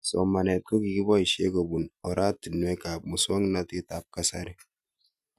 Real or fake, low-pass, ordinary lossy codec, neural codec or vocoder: real; 14.4 kHz; none; none